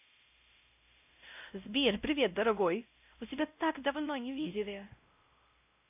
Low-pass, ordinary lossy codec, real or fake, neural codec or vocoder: 3.6 kHz; none; fake; codec, 16 kHz, 0.5 kbps, X-Codec, WavLM features, trained on Multilingual LibriSpeech